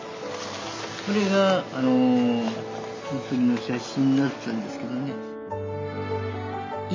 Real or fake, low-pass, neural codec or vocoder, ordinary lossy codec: real; 7.2 kHz; none; MP3, 48 kbps